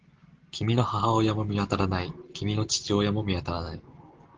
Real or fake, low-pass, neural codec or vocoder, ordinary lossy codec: real; 7.2 kHz; none; Opus, 16 kbps